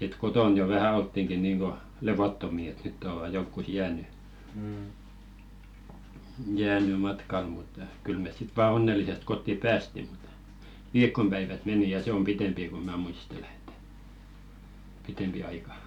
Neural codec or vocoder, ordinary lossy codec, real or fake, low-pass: none; none; real; 19.8 kHz